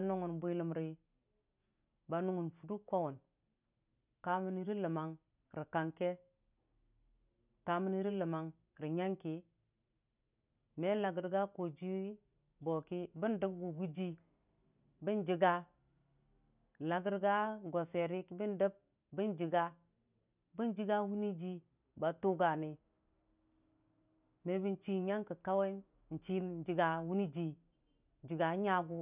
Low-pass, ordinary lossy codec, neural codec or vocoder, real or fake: 3.6 kHz; none; none; real